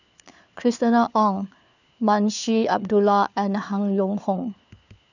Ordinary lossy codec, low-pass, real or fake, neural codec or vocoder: none; 7.2 kHz; fake; codec, 16 kHz, 4 kbps, FunCodec, trained on LibriTTS, 50 frames a second